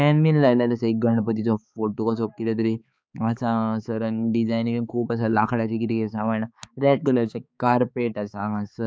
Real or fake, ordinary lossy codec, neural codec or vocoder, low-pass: fake; none; codec, 16 kHz, 4 kbps, X-Codec, HuBERT features, trained on balanced general audio; none